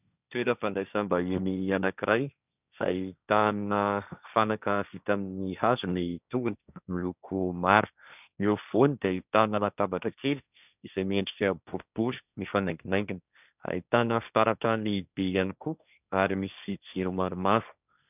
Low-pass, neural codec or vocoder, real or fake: 3.6 kHz; codec, 16 kHz, 1.1 kbps, Voila-Tokenizer; fake